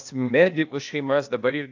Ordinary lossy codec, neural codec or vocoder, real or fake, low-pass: AAC, 48 kbps; codec, 16 kHz, 0.8 kbps, ZipCodec; fake; 7.2 kHz